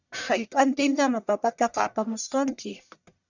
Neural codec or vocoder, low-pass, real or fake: codec, 44.1 kHz, 1.7 kbps, Pupu-Codec; 7.2 kHz; fake